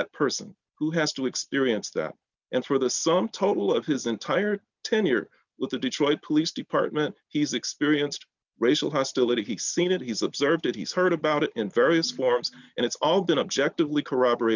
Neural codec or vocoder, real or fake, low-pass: none; real; 7.2 kHz